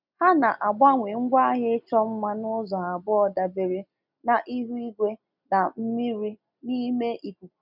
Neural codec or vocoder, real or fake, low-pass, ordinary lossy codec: none; real; 5.4 kHz; none